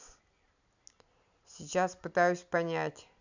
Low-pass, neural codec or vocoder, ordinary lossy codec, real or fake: 7.2 kHz; none; none; real